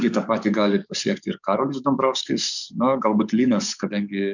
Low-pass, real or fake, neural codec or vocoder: 7.2 kHz; fake; codec, 44.1 kHz, 7.8 kbps, DAC